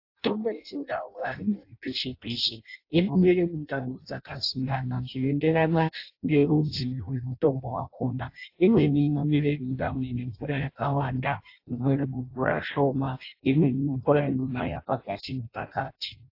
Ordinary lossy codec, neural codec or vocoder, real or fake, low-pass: AAC, 32 kbps; codec, 16 kHz in and 24 kHz out, 0.6 kbps, FireRedTTS-2 codec; fake; 5.4 kHz